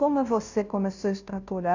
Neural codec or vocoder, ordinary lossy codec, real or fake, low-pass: codec, 16 kHz, 0.5 kbps, FunCodec, trained on Chinese and English, 25 frames a second; none; fake; 7.2 kHz